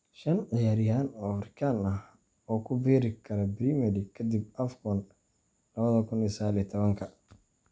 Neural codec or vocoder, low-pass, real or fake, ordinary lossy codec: none; none; real; none